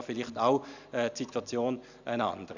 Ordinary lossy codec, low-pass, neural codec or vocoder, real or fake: none; 7.2 kHz; none; real